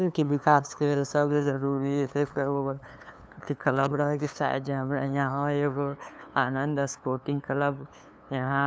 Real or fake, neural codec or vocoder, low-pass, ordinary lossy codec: fake; codec, 16 kHz, 2 kbps, FunCodec, trained on LibriTTS, 25 frames a second; none; none